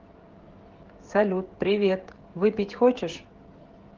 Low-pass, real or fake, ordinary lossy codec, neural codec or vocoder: 7.2 kHz; fake; Opus, 16 kbps; codec, 16 kHz, 16 kbps, FreqCodec, smaller model